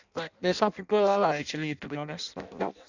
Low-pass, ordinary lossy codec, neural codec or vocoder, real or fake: 7.2 kHz; none; codec, 16 kHz in and 24 kHz out, 0.6 kbps, FireRedTTS-2 codec; fake